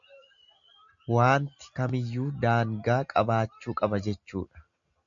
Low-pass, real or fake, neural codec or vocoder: 7.2 kHz; real; none